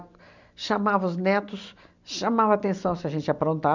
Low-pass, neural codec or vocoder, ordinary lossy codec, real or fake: 7.2 kHz; none; none; real